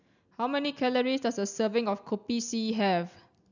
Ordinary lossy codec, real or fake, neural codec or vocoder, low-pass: none; real; none; 7.2 kHz